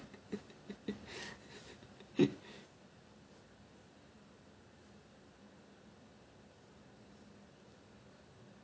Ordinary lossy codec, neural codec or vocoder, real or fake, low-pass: none; none; real; none